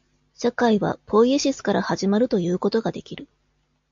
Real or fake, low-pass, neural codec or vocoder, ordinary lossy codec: real; 7.2 kHz; none; AAC, 64 kbps